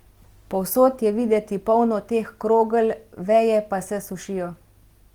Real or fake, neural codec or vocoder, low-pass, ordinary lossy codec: real; none; 19.8 kHz; Opus, 24 kbps